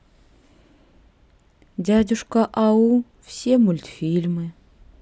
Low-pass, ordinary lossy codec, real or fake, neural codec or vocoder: none; none; real; none